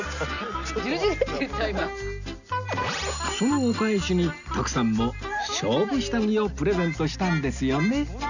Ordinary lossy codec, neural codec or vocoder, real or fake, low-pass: none; none; real; 7.2 kHz